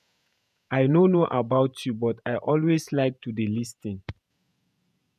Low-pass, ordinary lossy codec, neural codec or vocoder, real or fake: 14.4 kHz; none; vocoder, 48 kHz, 128 mel bands, Vocos; fake